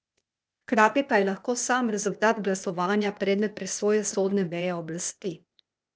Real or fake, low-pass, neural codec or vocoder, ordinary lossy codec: fake; none; codec, 16 kHz, 0.8 kbps, ZipCodec; none